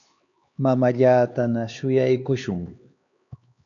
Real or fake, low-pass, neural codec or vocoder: fake; 7.2 kHz; codec, 16 kHz, 4 kbps, X-Codec, HuBERT features, trained on LibriSpeech